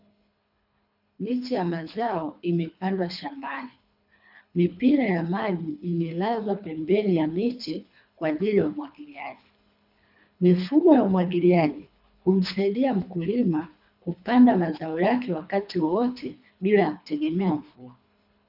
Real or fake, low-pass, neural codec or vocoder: fake; 5.4 kHz; codec, 24 kHz, 3 kbps, HILCodec